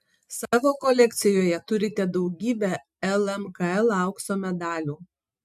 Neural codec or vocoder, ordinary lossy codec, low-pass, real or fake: none; MP3, 96 kbps; 14.4 kHz; real